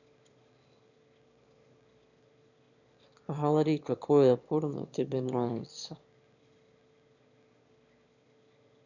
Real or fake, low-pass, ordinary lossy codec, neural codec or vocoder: fake; 7.2 kHz; none; autoencoder, 22.05 kHz, a latent of 192 numbers a frame, VITS, trained on one speaker